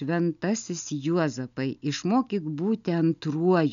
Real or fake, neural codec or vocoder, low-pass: real; none; 7.2 kHz